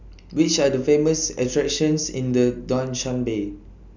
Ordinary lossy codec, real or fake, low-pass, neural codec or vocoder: none; real; 7.2 kHz; none